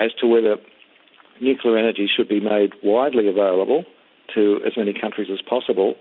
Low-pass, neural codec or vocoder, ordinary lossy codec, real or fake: 5.4 kHz; none; MP3, 48 kbps; real